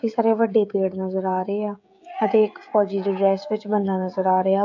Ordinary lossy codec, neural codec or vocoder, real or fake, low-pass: AAC, 48 kbps; codec, 16 kHz, 16 kbps, FreqCodec, smaller model; fake; 7.2 kHz